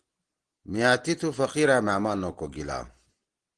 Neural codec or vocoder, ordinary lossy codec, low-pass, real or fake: none; Opus, 16 kbps; 9.9 kHz; real